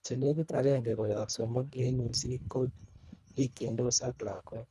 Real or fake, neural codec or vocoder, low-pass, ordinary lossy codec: fake; codec, 24 kHz, 1.5 kbps, HILCodec; none; none